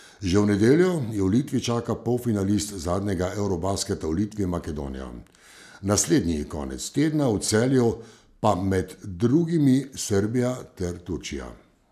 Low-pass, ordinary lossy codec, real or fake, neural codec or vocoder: 14.4 kHz; none; real; none